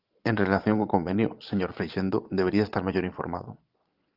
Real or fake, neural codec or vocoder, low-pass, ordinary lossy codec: fake; vocoder, 22.05 kHz, 80 mel bands, Vocos; 5.4 kHz; Opus, 24 kbps